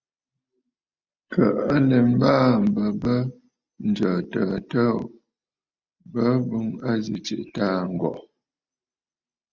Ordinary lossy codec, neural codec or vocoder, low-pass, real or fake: Opus, 64 kbps; none; 7.2 kHz; real